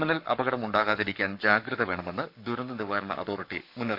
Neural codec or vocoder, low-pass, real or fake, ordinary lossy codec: codec, 44.1 kHz, 7.8 kbps, Pupu-Codec; 5.4 kHz; fake; none